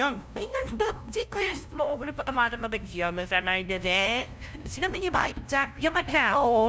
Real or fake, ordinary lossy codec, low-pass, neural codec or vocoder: fake; none; none; codec, 16 kHz, 0.5 kbps, FunCodec, trained on LibriTTS, 25 frames a second